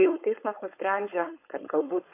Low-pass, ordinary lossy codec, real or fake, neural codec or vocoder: 3.6 kHz; AAC, 24 kbps; fake; codec, 16 kHz, 4.8 kbps, FACodec